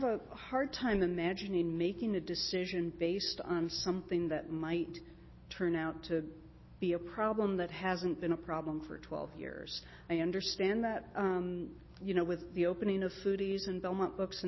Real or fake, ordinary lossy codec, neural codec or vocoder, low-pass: real; MP3, 24 kbps; none; 7.2 kHz